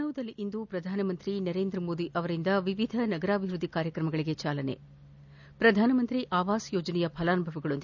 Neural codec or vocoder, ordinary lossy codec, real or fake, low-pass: none; none; real; 7.2 kHz